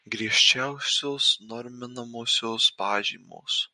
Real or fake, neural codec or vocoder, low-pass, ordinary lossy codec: real; none; 10.8 kHz; MP3, 48 kbps